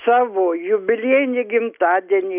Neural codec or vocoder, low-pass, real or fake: none; 3.6 kHz; real